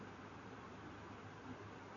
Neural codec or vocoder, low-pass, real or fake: none; 7.2 kHz; real